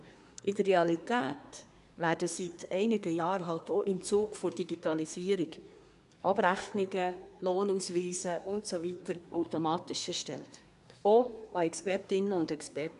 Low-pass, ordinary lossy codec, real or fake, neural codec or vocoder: 10.8 kHz; none; fake; codec, 24 kHz, 1 kbps, SNAC